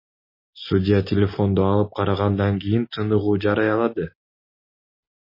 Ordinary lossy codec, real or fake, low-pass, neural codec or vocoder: MP3, 24 kbps; real; 5.4 kHz; none